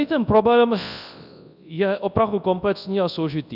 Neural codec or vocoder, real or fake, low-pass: codec, 24 kHz, 0.9 kbps, WavTokenizer, large speech release; fake; 5.4 kHz